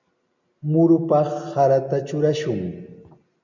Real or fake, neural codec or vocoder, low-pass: real; none; 7.2 kHz